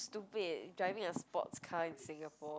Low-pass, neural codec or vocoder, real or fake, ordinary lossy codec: none; none; real; none